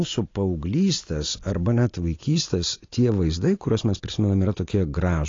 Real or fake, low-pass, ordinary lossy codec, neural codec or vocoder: real; 7.2 kHz; AAC, 32 kbps; none